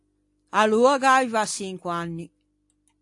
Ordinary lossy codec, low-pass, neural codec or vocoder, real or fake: AAC, 64 kbps; 10.8 kHz; none; real